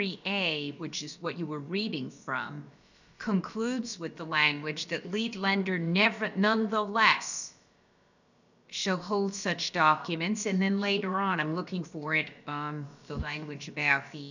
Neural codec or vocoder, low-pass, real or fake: codec, 16 kHz, about 1 kbps, DyCAST, with the encoder's durations; 7.2 kHz; fake